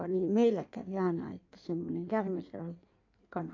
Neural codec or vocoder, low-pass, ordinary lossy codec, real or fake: codec, 16 kHz in and 24 kHz out, 1.1 kbps, FireRedTTS-2 codec; 7.2 kHz; AAC, 48 kbps; fake